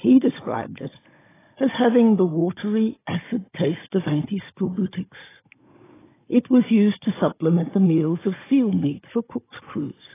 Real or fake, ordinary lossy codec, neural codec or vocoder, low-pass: fake; AAC, 16 kbps; codec, 16 kHz, 16 kbps, FunCodec, trained on Chinese and English, 50 frames a second; 3.6 kHz